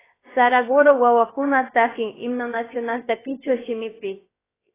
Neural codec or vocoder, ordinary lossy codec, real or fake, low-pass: codec, 16 kHz, 0.7 kbps, FocalCodec; AAC, 16 kbps; fake; 3.6 kHz